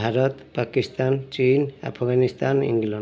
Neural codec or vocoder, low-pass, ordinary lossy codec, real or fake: none; none; none; real